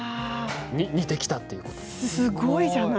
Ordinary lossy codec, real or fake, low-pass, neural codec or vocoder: none; real; none; none